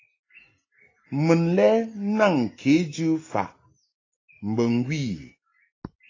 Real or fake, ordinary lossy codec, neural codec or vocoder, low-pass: real; AAC, 32 kbps; none; 7.2 kHz